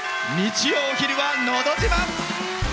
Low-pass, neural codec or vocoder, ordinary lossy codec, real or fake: none; none; none; real